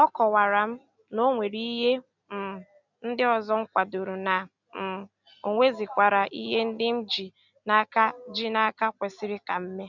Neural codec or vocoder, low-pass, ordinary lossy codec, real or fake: none; 7.2 kHz; none; real